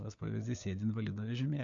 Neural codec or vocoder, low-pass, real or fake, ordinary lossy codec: codec, 16 kHz, 4 kbps, FunCodec, trained on LibriTTS, 50 frames a second; 7.2 kHz; fake; AAC, 64 kbps